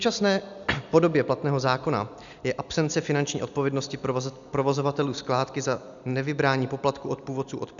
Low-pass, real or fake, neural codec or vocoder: 7.2 kHz; real; none